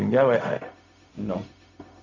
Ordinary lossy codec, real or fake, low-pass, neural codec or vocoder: none; fake; 7.2 kHz; codec, 16 kHz, 0.4 kbps, LongCat-Audio-Codec